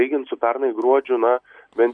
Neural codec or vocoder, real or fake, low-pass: none; real; 9.9 kHz